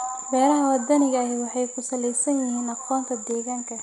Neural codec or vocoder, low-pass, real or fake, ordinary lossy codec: none; 10.8 kHz; real; none